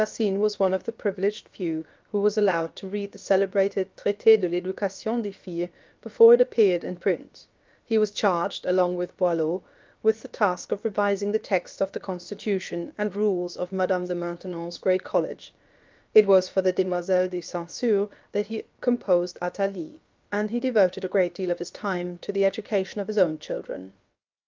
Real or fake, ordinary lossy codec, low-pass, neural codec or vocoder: fake; Opus, 24 kbps; 7.2 kHz; codec, 16 kHz, about 1 kbps, DyCAST, with the encoder's durations